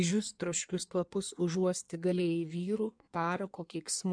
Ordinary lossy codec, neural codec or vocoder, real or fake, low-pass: MP3, 96 kbps; codec, 16 kHz in and 24 kHz out, 1.1 kbps, FireRedTTS-2 codec; fake; 9.9 kHz